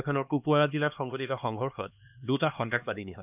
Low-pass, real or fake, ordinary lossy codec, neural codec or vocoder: 3.6 kHz; fake; none; codec, 16 kHz, 2 kbps, X-Codec, HuBERT features, trained on LibriSpeech